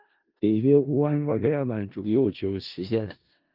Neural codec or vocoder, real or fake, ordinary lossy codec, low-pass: codec, 16 kHz in and 24 kHz out, 0.4 kbps, LongCat-Audio-Codec, four codebook decoder; fake; Opus, 24 kbps; 5.4 kHz